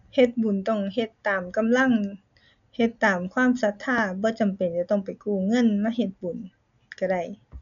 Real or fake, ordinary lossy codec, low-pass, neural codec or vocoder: real; none; 7.2 kHz; none